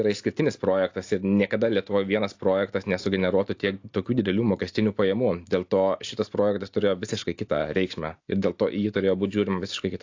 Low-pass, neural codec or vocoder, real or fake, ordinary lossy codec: 7.2 kHz; none; real; AAC, 48 kbps